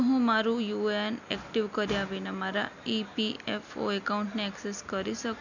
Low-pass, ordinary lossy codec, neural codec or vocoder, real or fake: 7.2 kHz; none; none; real